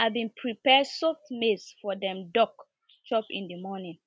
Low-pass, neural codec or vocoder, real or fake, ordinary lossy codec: none; none; real; none